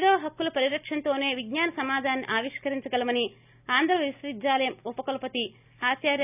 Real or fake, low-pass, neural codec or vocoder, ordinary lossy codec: real; 3.6 kHz; none; none